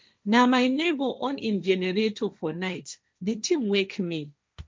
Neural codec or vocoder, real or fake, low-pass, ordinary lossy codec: codec, 16 kHz, 1.1 kbps, Voila-Tokenizer; fake; none; none